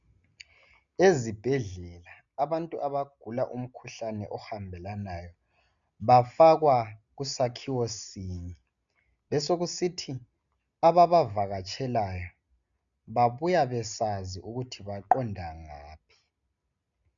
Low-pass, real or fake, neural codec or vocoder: 7.2 kHz; real; none